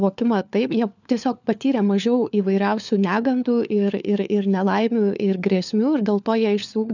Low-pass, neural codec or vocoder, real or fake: 7.2 kHz; codec, 16 kHz, 4 kbps, FunCodec, trained on LibriTTS, 50 frames a second; fake